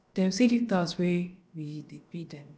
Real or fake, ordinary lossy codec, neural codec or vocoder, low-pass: fake; none; codec, 16 kHz, about 1 kbps, DyCAST, with the encoder's durations; none